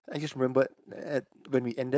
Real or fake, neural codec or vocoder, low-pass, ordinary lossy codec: fake; codec, 16 kHz, 4.8 kbps, FACodec; none; none